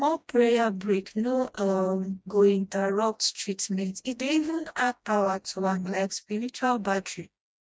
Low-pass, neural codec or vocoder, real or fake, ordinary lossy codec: none; codec, 16 kHz, 1 kbps, FreqCodec, smaller model; fake; none